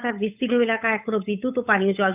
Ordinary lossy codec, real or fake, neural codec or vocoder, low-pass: none; fake; codec, 16 kHz, 8 kbps, FunCodec, trained on Chinese and English, 25 frames a second; 3.6 kHz